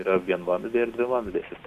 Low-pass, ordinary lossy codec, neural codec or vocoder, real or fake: 14.4 kHz; MP3, 96 kbps; none; real